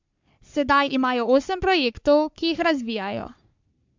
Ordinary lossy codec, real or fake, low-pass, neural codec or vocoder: MP3, 64 kbps; fake; 7.2 kHz; codec, 44.1 kHz, 3.4 kbps, Pupu-Codec